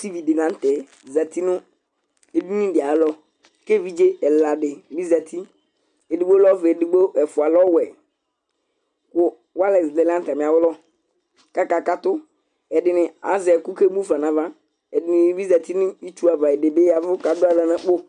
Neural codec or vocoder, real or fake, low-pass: none; real; 10.8 kHz